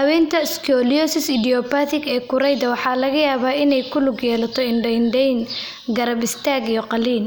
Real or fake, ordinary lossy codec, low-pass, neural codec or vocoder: real; none; none; none